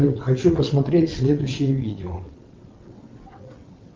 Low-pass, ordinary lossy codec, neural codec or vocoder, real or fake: 7.2 kHz; Opus, 32 kbps; vocoder, 22.05 kHz, 80 mel bands, WaveNeXt; fake